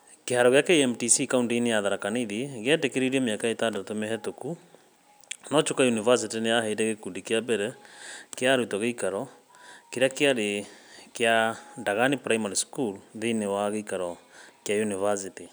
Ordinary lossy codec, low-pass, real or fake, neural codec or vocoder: none; none; real; none